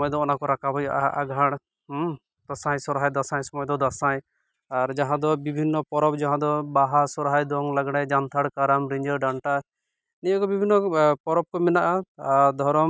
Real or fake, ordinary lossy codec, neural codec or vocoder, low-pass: real; none; none; none